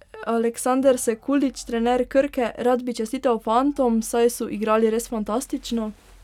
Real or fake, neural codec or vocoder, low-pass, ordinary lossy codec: real; none; 19.8 kHz; none